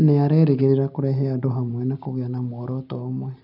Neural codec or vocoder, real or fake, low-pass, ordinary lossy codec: none; real; 5.4 kHz; none